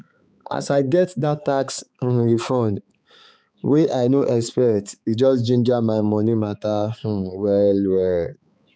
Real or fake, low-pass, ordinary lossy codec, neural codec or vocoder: fake; none; none; codec, 16 kHz, 4 kbps, X-Codec, HuBERT features, trained on balanced general audio